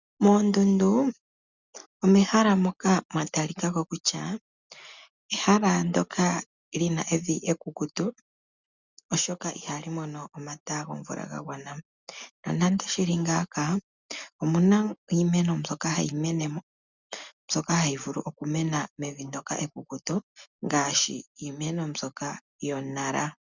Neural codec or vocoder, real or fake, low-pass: none; real; 7.2 kHz